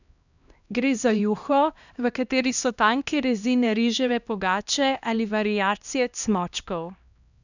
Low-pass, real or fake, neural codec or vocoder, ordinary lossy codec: 7.2 kHz; fake; codec, 16 kHz, 1 kbps, X-Codec, HuBERT features, trained on LibriSpeech; none